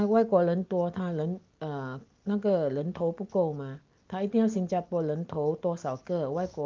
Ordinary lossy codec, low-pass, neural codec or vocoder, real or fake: Opus, 32 kbps; 7.2 kHz; none; real